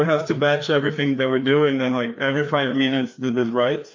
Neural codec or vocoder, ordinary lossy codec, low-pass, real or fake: codec, 16 kHz, 2 kbps, FreqCodec, larger model; MP3, 48 kbps; 7.2 kHz; fake